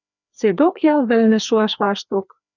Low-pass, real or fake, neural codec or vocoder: 7.2 kHz; fake; codec, 16 kHz, 2 kbps, FreqCodec, larger model